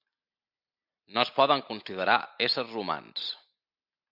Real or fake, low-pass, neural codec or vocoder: real; 5.4 kHz; none